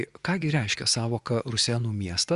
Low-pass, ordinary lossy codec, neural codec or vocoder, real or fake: 10.8 kHz; Opus, 64 kbps; vocoder, 24 kHz, 100 mel bands, Vocos; fake